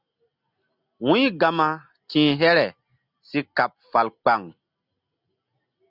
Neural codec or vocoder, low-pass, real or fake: none; 5.4 kHz; real